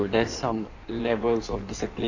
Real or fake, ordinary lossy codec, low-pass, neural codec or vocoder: fake; none; 7.2 kHz; codec, 16 kHz in and 24 kHz out, 1.1 kbps, FireRedTTS-2 codec